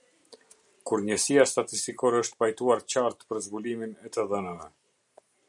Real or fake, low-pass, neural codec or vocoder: real; 10.8 kHz; none